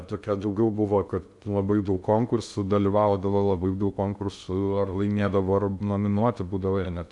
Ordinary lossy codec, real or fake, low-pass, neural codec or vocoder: MP3, 96 kbps; fake; 10.8 kHz; codec, 16 kHz in and 24 kHz out, 0.6 kbps, FocalCodec, streaming, 2048 codes